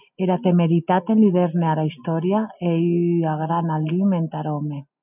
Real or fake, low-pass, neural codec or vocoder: real; 3.6 kHz; none